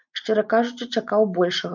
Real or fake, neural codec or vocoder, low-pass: real; none; 7.2 kHz